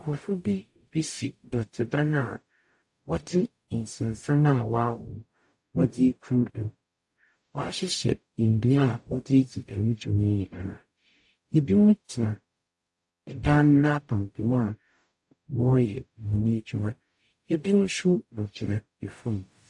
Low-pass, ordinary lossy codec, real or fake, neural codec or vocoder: 10.8 kHz; AAC, 64 kbps; fake; codec, 44.1 kHz, 0.9 kbps, DAC